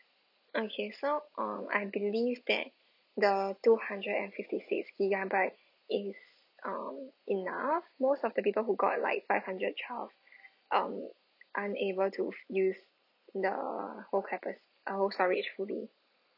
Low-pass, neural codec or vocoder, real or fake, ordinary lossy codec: 5.4 kHz; none; real; none